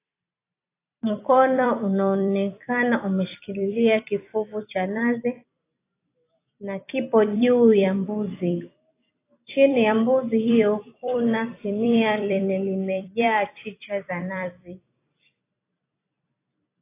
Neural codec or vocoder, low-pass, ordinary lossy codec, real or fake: vocoder, 44.1 kHz, 128 mel bands every 256 samples, BigVGAN v2; 3.6 kHz; AAC, 24 kbps; fake